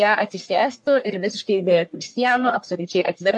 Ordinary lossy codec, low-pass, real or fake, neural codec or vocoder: AAC, 64 kbps; 10.8 kHz; fake; codec, 44.1 kHz, 1.7 kbps, Pupu-Codec